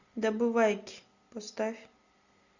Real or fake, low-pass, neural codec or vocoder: real; 7.2 kHz; none